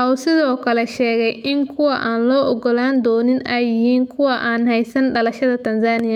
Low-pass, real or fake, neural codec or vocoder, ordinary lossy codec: 19.8 kHz; fake; autoencoder, 48 kHz, 128 numbers a frame, DAC-VAE, trained on Japanese speech; MP3, 96 kbps